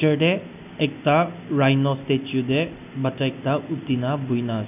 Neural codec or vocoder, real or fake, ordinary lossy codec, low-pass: none; real; none; 3.6 kHz